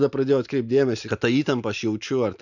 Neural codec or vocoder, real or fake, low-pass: none; real; 7.2 kHz